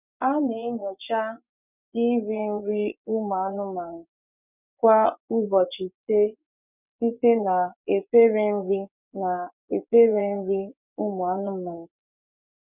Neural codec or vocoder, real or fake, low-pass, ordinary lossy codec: vocoder, 24 kHz, 100 mel bands, Vocos; fake; 3.6 kHz; none